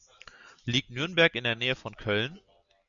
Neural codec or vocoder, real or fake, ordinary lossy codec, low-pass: none; real; MP3, 96 kbps; 7.2 kHz